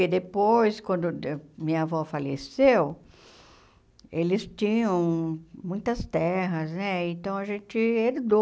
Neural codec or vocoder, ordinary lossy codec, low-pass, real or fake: none; none; none; real